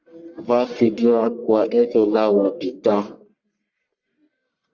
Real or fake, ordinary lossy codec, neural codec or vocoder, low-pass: fake; Opus, 64 kbps; codec, 44.1 kHz, 1.7 kbps, Pupu-Codec; 7.2 kHz